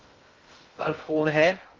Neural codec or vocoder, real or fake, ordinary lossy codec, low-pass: codec, 16 kHz in and 24 kHz out, 0.6 kbps, FocalCodec, streaming, 2048 codes; fake; Opus, 32 kbps; 7.2 kHz